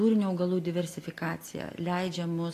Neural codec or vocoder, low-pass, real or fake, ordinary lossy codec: none; 14.4 kHz; real; AAC, 48 kbps